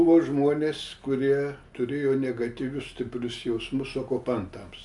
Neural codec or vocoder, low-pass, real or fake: none; 10.8 kHz; real